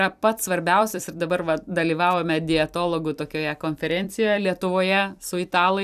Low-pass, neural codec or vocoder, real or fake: 14.4 kHz; vocoder, 44.1 kHz, 128 mel bands every 256 samples, BigVGAN v2; fake